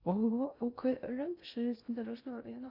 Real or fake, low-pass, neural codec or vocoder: fake; 5.4 kHz; codec, 16 kHz in and 24 kHz out, 0.6 kbps, FocalCodec, streaming, 2048 codes